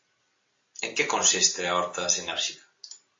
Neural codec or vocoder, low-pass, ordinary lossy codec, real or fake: none; 7.2 kHz; AAC, 32 kbps; real